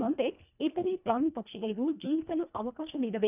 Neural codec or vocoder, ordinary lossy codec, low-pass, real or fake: codec, 24 kHz, 1.5 kbps, HILCodec; none; 3.6 kHz; fake